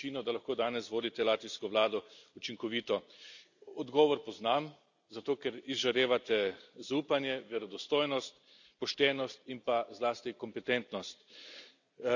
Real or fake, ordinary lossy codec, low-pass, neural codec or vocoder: real; none; 7.2 kHz; none